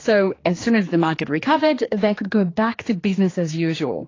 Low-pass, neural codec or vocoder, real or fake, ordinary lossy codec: 7.2 kHz; codec, 16 kHz, 2 kbps, X-Codec, HuBERT features, trained on general audio; fake; AAC, 32 kbps